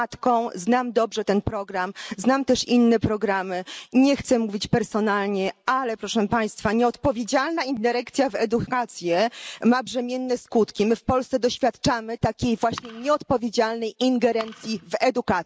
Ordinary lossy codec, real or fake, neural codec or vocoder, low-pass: none; real; none; none